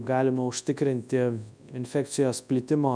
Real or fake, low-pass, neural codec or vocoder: fake; 9.9 kHz; codec, 24 kHz, 0.9 kbps, WavTokenizer, large speech release